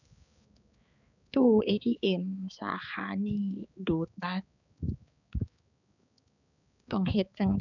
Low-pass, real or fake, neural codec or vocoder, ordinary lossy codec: 7.2 kHz; fake; codec, 16 kHz, 4 kbps, X-Codec, HuBERT features, trained on balanced general audio; none